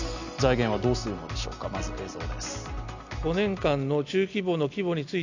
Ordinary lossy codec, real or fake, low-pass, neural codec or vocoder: none; real; 7.2 kHz; none